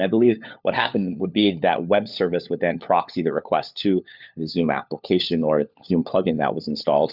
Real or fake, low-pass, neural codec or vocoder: fake; 5.4 kHz; codec, 16 kHz, 4 kbps, FunCodec, trained on LibriTTS, 50 frames a second